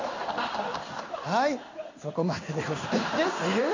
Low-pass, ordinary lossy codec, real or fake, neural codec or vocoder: 7.2 kHz; AAC, 48 kbps; fake; codec, 16 kHz in and 24 kHz out, 1 kbps, XY-Tokenizer